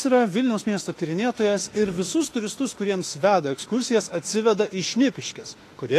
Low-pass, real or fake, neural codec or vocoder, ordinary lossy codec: 14.4 kHz; fake; autoencoder, 48 kHz, 32 numbers a frame, DAC-VAE, trained on Japanese speech; AAC, 48 kbps